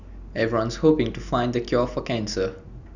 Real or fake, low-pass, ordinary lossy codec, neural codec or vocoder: real; 7.2 kHz; none; none